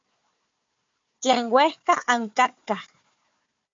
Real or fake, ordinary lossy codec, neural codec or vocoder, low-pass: fake; MP3, 48 kbps; codec, 16 kHz, 4 kbps, FunCodec, trained on Chinese and English, 50 frames a second; 7.2 kHz